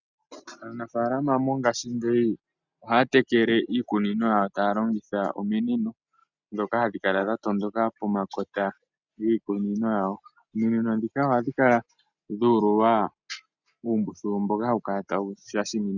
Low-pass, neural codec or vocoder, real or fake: 7.2 kHz; none; real